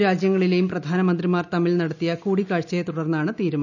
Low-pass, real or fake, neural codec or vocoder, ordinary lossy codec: 7.2 kHz; real; none; none